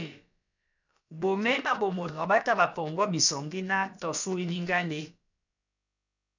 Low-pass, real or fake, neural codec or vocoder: 7.2 kHz; fake; codec, 16 kHz, about 1 kbps, DyCAST, with the encoder's durations